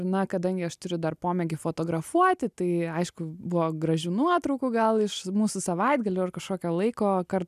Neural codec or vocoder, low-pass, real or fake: none; 14.4 kHz; real